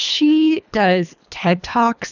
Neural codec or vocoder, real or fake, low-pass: codec, 24 kHz, 3 kbps, HILCodec; fake; 7.2 kHz